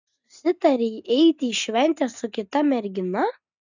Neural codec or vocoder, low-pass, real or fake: none; 7.2 kHz; real